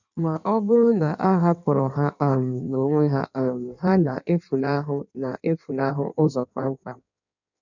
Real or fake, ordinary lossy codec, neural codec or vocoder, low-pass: fake; none; codec, 16 kHz in and 24 kHz out, 1.1 kbps, FireRedTTS-2 codec; 7.2 kHz